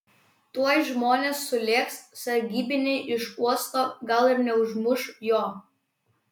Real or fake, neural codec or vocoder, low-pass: real; none; 19.8 kHz